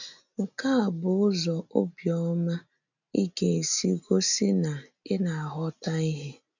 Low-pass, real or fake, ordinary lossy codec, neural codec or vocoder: 7.2 kHz; real; none; none